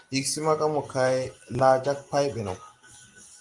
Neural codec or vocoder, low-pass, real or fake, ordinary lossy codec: none; 10.8 kHz; real; Opus, 32 kbps